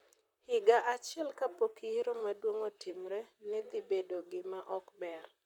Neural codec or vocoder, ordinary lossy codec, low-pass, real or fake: vocoder, 44.1 kHz, 128 mel bands, Pupu-Vocoder; none; 19.8 kHz; fake